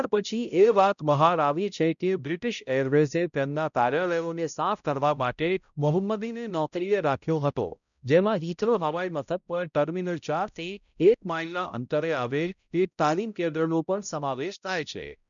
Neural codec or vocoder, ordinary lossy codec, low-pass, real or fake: codec, 16 kHz, 0.5 kbps, X-Codec, HuBERT features, trained on balanced general audio; none; 7.2 kHz; fake